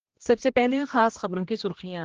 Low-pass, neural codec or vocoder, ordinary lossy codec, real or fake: 7.2 kHz; codec, 16 kHz, 1 kbps, X-Codec, HuBERT features, trained on general audio; Opus, 16 kbps; fake